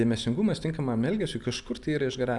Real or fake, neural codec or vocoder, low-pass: real; none; 10.8 kHz